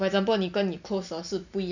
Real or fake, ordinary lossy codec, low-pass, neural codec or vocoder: real; none; 7.2 kHz; none